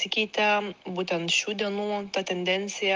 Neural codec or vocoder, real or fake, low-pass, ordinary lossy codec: none; real; 7.2 kHz; Opus, 32 kbps